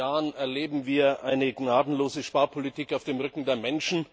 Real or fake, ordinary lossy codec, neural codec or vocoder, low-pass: real; none; none; none